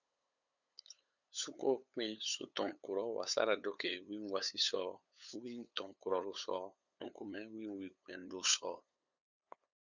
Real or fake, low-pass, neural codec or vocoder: fake; 7.2 kHz; codec, 16 kHz, 8 kbps, FunCodec, trained on LibriTTS, 25 frames a second